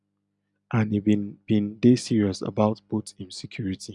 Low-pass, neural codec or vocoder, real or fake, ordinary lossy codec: 10.8 kHz; none; real; none